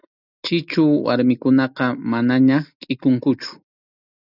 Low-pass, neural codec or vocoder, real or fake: 5.4 kHz; none; real